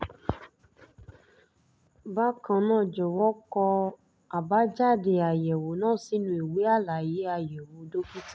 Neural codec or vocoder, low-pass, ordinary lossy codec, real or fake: none; none; none; real